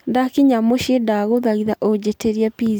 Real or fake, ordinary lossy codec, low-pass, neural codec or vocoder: real; none; none; none